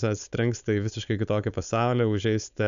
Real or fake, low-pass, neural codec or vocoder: fake; 7.2 kHz; codec, 16 kHz, 4.8 kbps, FACodec